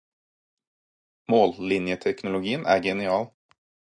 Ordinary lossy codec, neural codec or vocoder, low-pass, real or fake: AAC, 64 kbps; none; 9.9 kHz; real